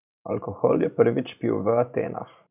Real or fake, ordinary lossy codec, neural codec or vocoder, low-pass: fake; none; vocoder, 44.1 kHz, 128 mel bands every 512 samples, BigVGAN v2; 3.6 kHz